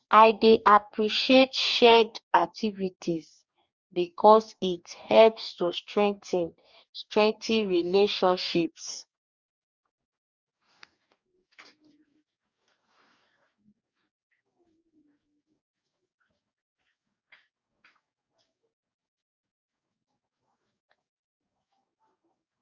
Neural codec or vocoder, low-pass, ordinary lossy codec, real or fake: codec, 44.1 kHz, 2.6 kbps, DAC; 7.2 kHz; Opus, 64 kbps; fake